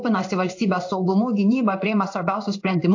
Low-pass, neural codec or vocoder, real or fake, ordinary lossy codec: 7.2 kHz; codec, 16 kHz in and 24 kHz out, 1 kbps, XY-Tokenizer; fake; AAC, 48 kbps